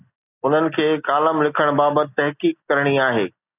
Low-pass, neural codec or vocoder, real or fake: 3.6 kHz; none; real